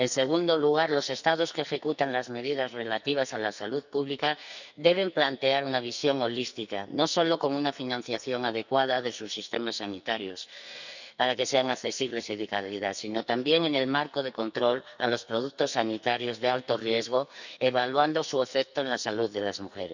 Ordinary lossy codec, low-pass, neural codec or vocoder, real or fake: none; 7.2 kHz; codec, 44.1 kHz, 2.6 kbps, SNAC; fake